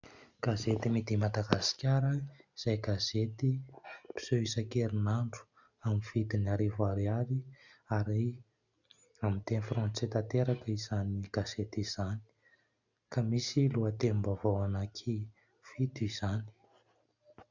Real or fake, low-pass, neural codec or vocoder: real; 7.2 kHz; none